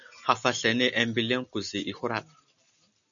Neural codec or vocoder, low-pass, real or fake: none; 7.2 kHz; real